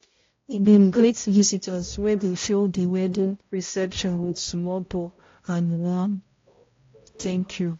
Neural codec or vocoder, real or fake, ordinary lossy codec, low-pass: codec, 16 kHz, 0.5 kbps, X-Codec, HuBERT features, trained on balanced general audio; fake; AAC, 32 kbps; 7.2 kHz